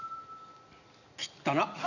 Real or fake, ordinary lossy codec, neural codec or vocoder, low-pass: real; none; none; 7.2 kHz